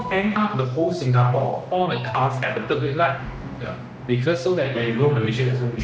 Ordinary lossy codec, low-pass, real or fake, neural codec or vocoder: none; none; fake; codec, 16 kHz, 1 kbps, X-Codec, HuBERT features, trained on general audio